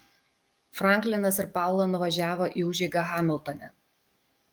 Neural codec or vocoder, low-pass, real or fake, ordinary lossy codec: codec, 44.1 kHz, 7.8 kbps, DAC; 19.8 kHz; fake; Opus, 32 kbps